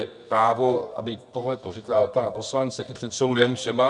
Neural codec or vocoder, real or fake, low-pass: codec, 24 kHz, 0.9 kbps, WavTokenizer, medium music audio release; fake; 10.8 kHz